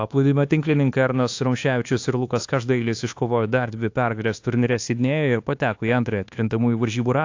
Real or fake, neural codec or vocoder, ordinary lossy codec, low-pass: fake; codec, 24 kHz, 1.2 kbps, DualCodec; AAC, 48 kbps; 7.2 kHz